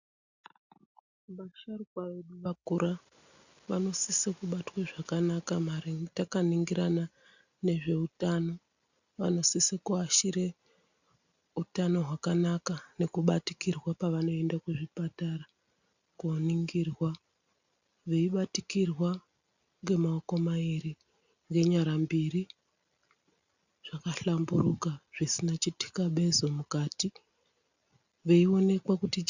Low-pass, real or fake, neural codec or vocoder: 7.2 kHz; real; none